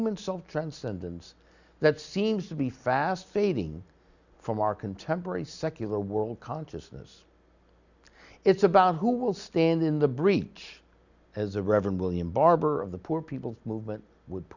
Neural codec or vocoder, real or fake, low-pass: none; real; 7.2 kHz